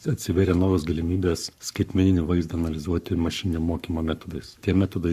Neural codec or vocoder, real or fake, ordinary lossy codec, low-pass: codec, 44.1 kHz, 7.8 kbps, Pupu-Codec; fake; Opus, 64 kbps; 14.4 kHz